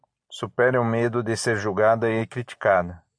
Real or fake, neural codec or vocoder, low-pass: real; none; 9.9 kHz